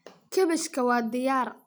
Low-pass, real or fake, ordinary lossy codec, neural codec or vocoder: none; real; none; none